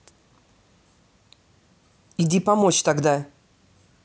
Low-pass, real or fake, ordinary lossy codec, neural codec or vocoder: none; real; none; none